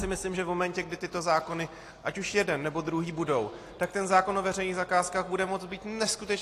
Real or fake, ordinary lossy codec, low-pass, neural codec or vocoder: real; AAC, 48 kbps; 14.4 kHz; none